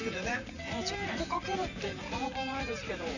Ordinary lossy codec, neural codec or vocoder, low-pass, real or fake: none; vocoder, 44.1 kHz, 128 mel bands, Pupu-Vocoder; 7.2 kHz; fake